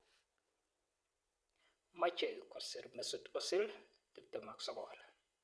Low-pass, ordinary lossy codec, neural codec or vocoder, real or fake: none; none; vocoder, 22.05 kHz, 80 mel bands, WaveNeXt; fake